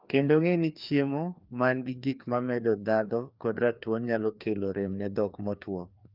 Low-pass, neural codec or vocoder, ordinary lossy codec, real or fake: 5.4 kHz; codec, 16 kHz, 2 kbps, FreqCodec, larger model; Opus, 32 kbps; fake